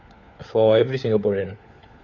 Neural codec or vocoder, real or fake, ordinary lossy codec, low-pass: codec, 16 kHz, 4 kbps, FunCodec, trained on LibriTTS, 50 frames a second; fake; none; 7.2 kHz